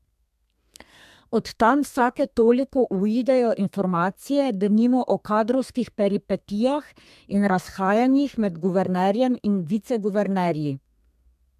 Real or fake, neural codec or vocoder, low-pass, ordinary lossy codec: fake; codec, 32 kHz, 1.9 kbps, SNAC; 14.4 kHz; MP3, 96 kbps